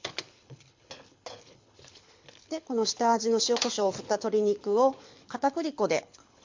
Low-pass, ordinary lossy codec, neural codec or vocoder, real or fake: 7.2 kHz; MP3, 48 kbps; codec, 24 kHz, 6 kbps, HILCodec; fake